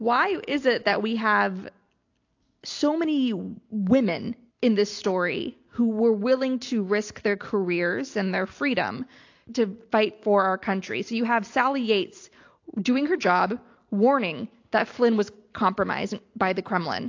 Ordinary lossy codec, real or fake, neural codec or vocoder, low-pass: AAC, 48 kbps; real; none; 7.2 kHz